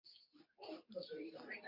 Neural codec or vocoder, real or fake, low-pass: codec, 24 kHz, 0.9 kbps, WavTokenizer, medium speech release version 2; fake; 5.4 kHz